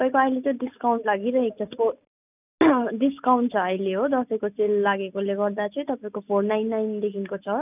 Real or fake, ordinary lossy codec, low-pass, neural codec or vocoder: real; none; 3.6 kHz; none